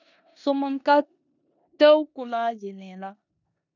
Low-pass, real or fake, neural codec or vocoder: 7.2 kHz; fake; codec, 16 kHz in and 24 kHz out, 0.9 kbps, LongCat-Audio-Codec, four codebook decoder